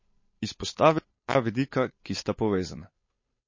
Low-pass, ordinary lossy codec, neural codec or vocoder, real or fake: 7.2 kHz; MP3, 32 kbps; codec, 16 kHz, 8 kbps, FunCodec, trained on Chinese and English, 25 frames a second; fake